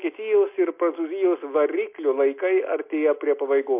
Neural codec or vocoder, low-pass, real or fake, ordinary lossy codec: none; 3.6 kHz; real; MP3, 32 kbps